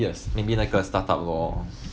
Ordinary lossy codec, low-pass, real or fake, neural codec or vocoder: none; none; real; none